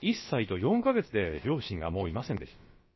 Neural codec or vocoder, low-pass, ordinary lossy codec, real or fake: codec, 16 kHz, about 1 kbps, DyCAST, with the encoder's durations; 7.2 kHz; MP3, 24 kbps; fake